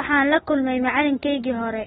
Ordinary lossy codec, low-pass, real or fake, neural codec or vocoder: AAC, 16 kbps; 19.8 kHz; real; none